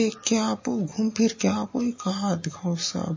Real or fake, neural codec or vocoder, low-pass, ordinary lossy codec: real; none; 7.2 kHz; MP3, 32 kbps